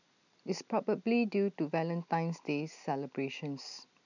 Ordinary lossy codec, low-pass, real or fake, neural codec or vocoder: none; 7.2 kHz; real; none